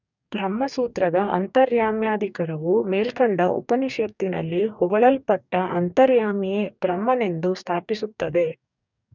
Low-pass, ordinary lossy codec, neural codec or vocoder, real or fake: 7.2 kHz; none; codec, 44.1 kHz, 2.6 kbps, DAC; fake